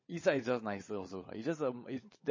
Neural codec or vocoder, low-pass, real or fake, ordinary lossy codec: codec, 16 kHz, 16 kbps, FunCodec, trained on LibriTTS, 50 frames a second; 7.2 kHz; fake; MP3, 32 kbps